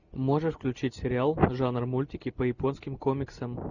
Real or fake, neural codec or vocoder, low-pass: real; none; 7.2 kHz